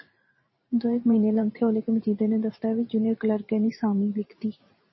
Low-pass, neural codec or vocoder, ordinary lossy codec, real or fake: 7.2 kHz; vocoder, 44.1 kHz, 128 mel bands every 512 samples, BigVGAN v2; MP3, 24 kbps; fake